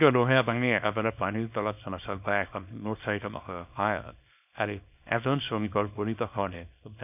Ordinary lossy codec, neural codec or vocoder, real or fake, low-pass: none; codec, 24 kHz, 0.9 kbps, WavTokenizer, small release; fake; 3.6 kHz